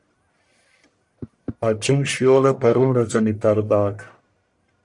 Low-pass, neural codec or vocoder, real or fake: 10.8 kHz; codec, 44.1 kHz, 1.7 kbps, Pupu-Codec; fake